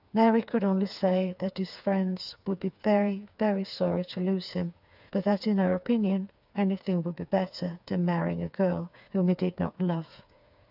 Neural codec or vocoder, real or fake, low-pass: codec, 16 kHz, 4 kbps, FreqCodec, smaller model; fake; 5.4 kHz